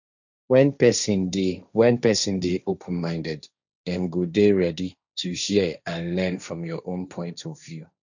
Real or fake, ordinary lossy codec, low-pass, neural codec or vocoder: fake; none; 7.2 kHz; codec, 16 kHz, 1.1 kbps, Voila-Tokenizer